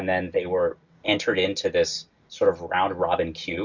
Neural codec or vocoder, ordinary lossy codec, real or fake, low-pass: none; Opus, 64 kbps; real; 7.2 kHz